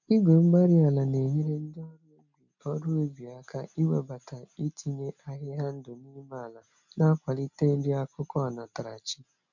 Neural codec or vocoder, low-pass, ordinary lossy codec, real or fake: none; 7.2 kHz; none; real